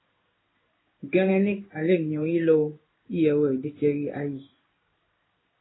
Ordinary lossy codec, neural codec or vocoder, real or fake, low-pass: AAC, 16 kbps; codec, 16 kHz in and 24 kHz out, 1 kbps, XY-Tokenizer; fake; 7.2 kHz